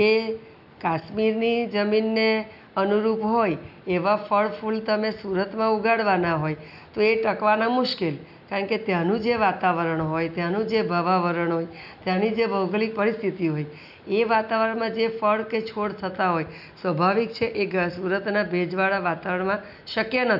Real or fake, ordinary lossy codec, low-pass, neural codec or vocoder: real; none; 5.4 kHz; none